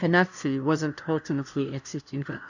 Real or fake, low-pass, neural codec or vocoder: fake; 7.2 kHz; codec, 16 kHz, 0.5 kbps, FunCodec, trained on LibriTTS, 25 frames a second